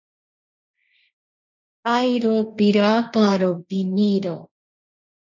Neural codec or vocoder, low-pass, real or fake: codec, 16 kHz, 1.1 kbps, Voila-Tokenizer; 7.2 kHz; fake